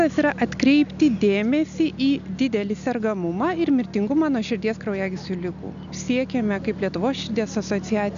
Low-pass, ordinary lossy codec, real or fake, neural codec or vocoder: 7.2 kHz; MP3, 96 kbps; real; none